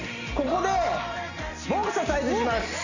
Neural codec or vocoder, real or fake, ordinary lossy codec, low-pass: none; real; none; 7.2 kHz